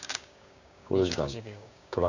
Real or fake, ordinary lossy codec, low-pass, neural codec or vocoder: fake; none; 7.2 kHz; codec, 16 kHz, 6 kbps, DAC